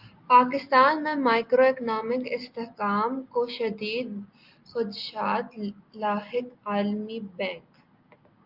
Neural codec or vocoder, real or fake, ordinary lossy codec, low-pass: none; real; Opus, 24 kbps; 5.4 kHz